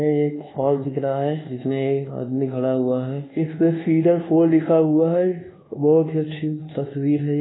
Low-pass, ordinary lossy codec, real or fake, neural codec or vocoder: 7.2 kHz; AAC, 16 kbps; fake; codec, 24 kHz, 1.2 kbps, DualCodec